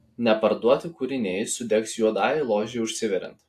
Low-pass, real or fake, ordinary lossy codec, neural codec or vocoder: 14.4 kHz; real; AAC, 64 kbps; none